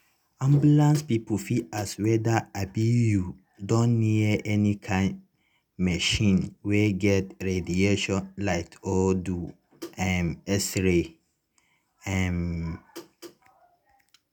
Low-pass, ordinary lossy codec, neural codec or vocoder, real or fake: none; none; none; real